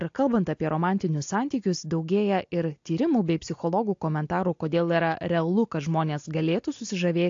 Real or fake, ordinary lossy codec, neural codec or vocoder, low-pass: real; AAC, 48 kbps; none; 7.2 kHz